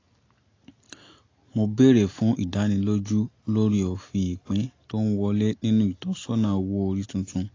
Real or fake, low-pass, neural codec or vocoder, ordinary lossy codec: real; 7.2 kHz; none; AAC, 32 kbps